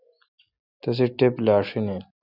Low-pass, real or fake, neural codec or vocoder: 5.4 kHz; real; none